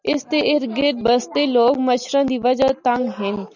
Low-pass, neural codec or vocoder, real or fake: 7.2 kHz; none; real